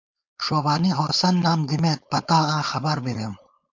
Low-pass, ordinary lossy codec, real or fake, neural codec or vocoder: 7.2 kHz; AAC, 48 kbps; fake; codec, 16 kHz, 4.8 kbps, FACodec